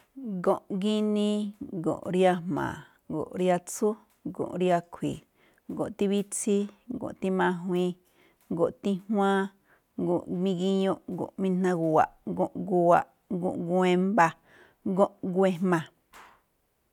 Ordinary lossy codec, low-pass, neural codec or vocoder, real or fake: none; 19.8 kHz; none; real